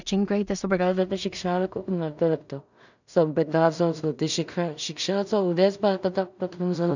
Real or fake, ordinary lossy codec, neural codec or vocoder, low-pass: fake; none; codec, 16 kHz in and 24 kHz out, 0.4 kbps, LongCat-Audio-Codec, two codebook decoder; 7.2 kHz